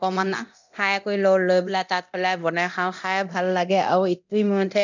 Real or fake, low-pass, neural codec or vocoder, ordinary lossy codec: fake; 7.2 kHz; codec, 24 kHz, 0.9 kbps, DualCodec; none